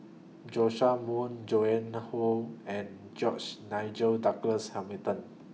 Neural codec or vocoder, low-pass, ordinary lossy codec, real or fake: none; none; none; real